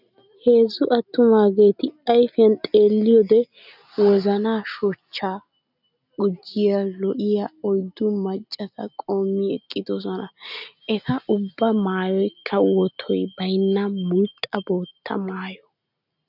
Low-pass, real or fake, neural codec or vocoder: 5.4 kHz; real; none